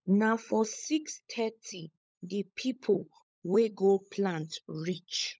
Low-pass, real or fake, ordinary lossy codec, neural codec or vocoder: none; fake; none; codec, 16 kHz, 16 kbps, FunCodec, trained on LibriTTS, 50 frames a second